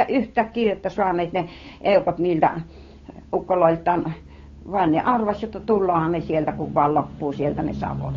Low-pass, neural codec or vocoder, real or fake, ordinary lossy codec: 7.2 kHz; codec, 16 kHz, 8 kbps, FunCodec, trained on Chinese and English, 25 frames a second; fake; AAC, 32 kbps